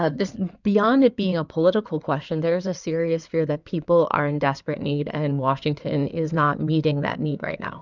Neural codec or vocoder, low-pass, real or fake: codec, 16 kHz in and 24 kHz out, 2.2 kbps, FireRedTTS-2 codec; 7.2 kHz; fake